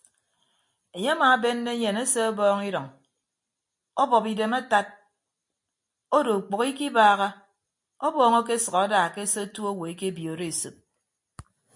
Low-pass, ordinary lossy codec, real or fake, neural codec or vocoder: 10.8 kHz; MP3, 64 kbps; real; none